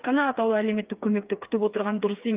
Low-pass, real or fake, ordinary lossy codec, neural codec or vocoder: 3.6 kHz; fake; Opus, 32 kbps; codec, 16 kHz, 4 kbps, FreqCodec, smaller model